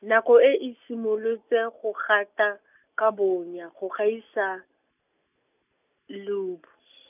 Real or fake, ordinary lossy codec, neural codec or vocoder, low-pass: real; none; none; 3.6 kHz